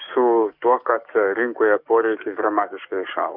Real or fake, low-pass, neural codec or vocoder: fake; 5.4 kHz; codec, 44.1 kHz, 7.8 kbps, DAC